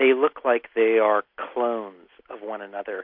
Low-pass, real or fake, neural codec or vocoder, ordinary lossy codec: 5.4 kHz; real; none; MP3, 48 kbps